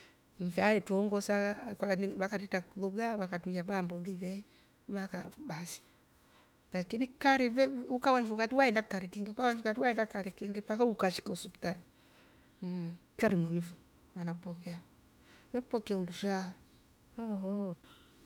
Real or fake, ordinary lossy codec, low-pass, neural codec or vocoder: fake; none; 19.8 kHz; autoencoder, 48 kHz, 32 numbers a frame, DAC-VAE, trained on Japanese speech